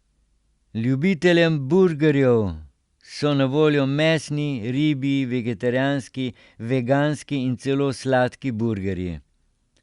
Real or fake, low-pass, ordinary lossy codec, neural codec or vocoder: real; 10.8 kHz; Opus, 64 kbps; none